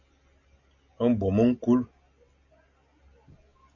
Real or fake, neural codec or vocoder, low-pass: real; none; 7.2 kHz